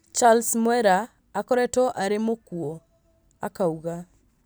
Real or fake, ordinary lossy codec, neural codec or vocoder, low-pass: real; none; none; none